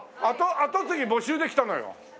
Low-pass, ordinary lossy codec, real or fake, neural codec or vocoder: none; none; real; none